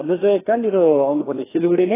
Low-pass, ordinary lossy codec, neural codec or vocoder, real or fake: 3.6 kHz; AAC, 16 kbps; codec, 16 kHz, 2 kbps, FreqCodec, larger model; fake